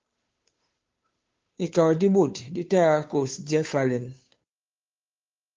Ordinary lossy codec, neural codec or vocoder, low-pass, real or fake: Opus, 32 kbps; codec, 16 kHz, 2 kbps, FunCodec, trained on Chinese and English, 25 frames a second; 7.2 kHz; fake